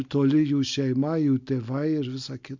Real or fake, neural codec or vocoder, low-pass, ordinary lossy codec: real; none; 7.2 kHz; MP3, 64 kbps